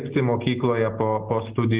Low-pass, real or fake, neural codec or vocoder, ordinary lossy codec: 3.6 kHz; real; none; Opus, 16 kbps